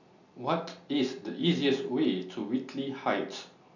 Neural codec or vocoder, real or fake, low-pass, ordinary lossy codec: none; real; 7.2 kHz; none